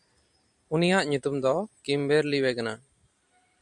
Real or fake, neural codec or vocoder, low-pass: fake; vocoder, 44.1 kHz, 128 mel bands every 512 samples, BigVGAN v2; 10.8 kHz